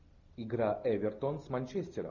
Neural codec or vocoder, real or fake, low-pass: none; real; 7.2 kHz